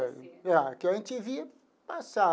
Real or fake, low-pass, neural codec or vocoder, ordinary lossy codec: real; none; none; none